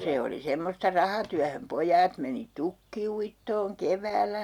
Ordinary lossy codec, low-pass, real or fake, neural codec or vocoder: none; 19.8 kHz; real; none